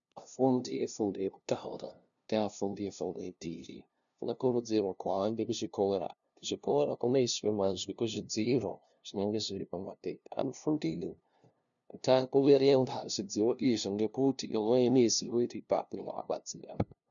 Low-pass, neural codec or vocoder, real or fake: 7.2 kHz; codec, 16 kHz, 0.5 kbps, FunCodec, trained on LibriTTS, 25 frames a second; fake